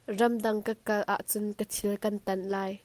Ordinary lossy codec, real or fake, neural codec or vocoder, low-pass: Opus, 32 kbps; real; none; 14.4 kHz